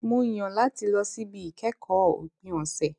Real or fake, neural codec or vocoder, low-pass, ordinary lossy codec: real; none; 10.8 kHz; none